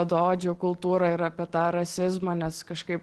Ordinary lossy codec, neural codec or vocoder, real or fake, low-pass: Opus, 16 kbps; none; real; 10.8 kHz